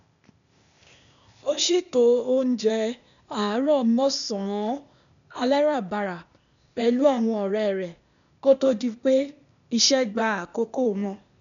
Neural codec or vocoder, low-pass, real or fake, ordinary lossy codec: codec, 16 kHz, 0.8 kbps, ZipCodec; 7.2 kHz; fake; MP3, 96 kbps